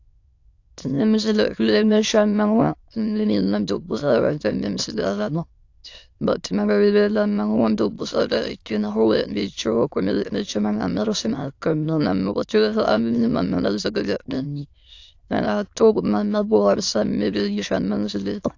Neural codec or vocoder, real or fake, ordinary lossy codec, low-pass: autoencoder, 22.05 kHz, a latent of 192 numbers a frame, VITS, trained on many speakers; fake; AAC, 48 kbps; 7.2 kHz